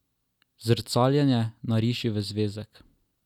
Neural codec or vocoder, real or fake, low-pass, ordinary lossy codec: none; real; 19.8 kHz; none